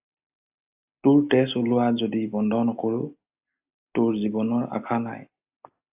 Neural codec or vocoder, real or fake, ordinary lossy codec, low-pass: none; real; AAC, 32 kbps; 3.6 kHz